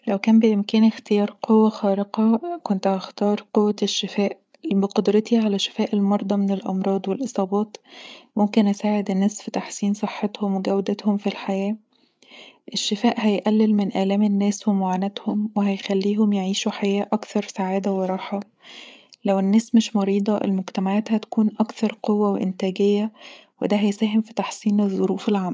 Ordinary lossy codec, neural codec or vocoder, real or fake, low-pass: none; codec, 16 kHz, 8 kbps, FreqCodec, larger model; fake; none